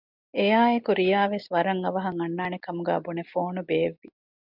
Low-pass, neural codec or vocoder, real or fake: 5.4 kHz; none; real